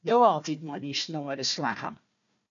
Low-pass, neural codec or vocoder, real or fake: 7.2 kHz; codec, 16 kHz, 1 kbps, FunCodec, trained on Chinese and English, 50 frames a second; fake